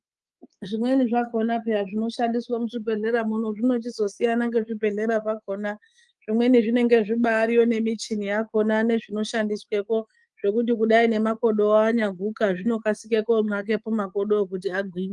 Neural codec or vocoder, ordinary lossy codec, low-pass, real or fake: codec, 24 kHz, 3.1 kbps, DualCodec; Opus, 24 kbps; 10.8 kHz; fake